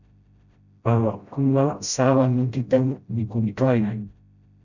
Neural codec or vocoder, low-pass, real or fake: codec, 16 kHz, 0.5 kbps, FreqCodec, smaller model; 7.2 kHz; fake